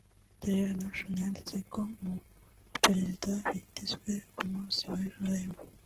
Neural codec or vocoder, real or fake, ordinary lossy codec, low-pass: codec, 44.1 kHz, 7.8 kbps, Pupu-Codec; fake; Opus, 16 kbps; 14.4 kHz